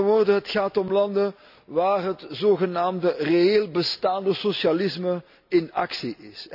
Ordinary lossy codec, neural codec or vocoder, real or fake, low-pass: none; none; real; 5.4 kHz